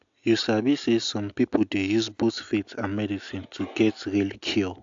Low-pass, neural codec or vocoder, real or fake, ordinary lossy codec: 7.2 kHz; none; real; AAC, 64 kbps